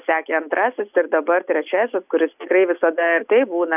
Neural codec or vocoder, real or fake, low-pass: none; real; 3.6 kHz